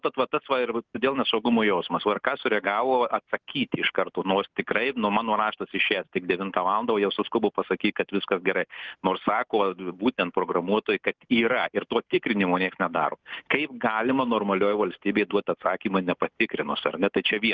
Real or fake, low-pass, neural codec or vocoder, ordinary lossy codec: real; 7.2 kHz; none; Opus, 24 kbps